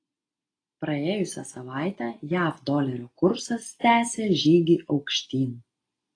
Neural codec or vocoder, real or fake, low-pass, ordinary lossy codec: none; real; 9.9 kHz; AAC, 32 kbps